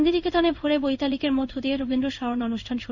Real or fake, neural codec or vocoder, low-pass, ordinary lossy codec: fake; codec, 16 kHz in and 24 kHz out, 1 kbps, XY-Tokenizer; 7.2 kHz; none